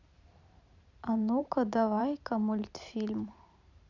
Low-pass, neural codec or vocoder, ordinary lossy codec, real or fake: 7.2 kHz; vocoder, 44.1 kHz, 128 mel bands every 512 samples, BigVGAN v2; none; fake